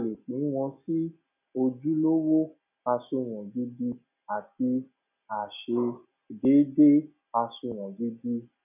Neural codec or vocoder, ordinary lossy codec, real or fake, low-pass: none; none; real; 3.6 kHz